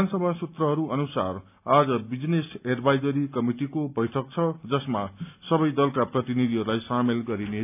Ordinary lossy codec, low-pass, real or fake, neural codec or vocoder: none; 3.6 kHz; real; none